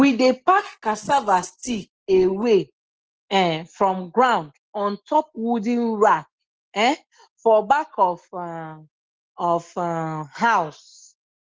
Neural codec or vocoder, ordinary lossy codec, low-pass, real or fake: none; Opus, 16 kbps; 7.2 kHz; real